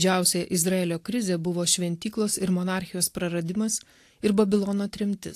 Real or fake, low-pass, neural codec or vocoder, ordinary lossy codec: real; 14.4 kHz; none; AAC, 64 kbps